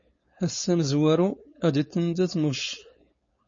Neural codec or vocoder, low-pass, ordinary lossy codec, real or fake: codec, 16 kHz, 4.8 kbps, FACodec; 7.2 kHz; MP3, 32 kbps; fake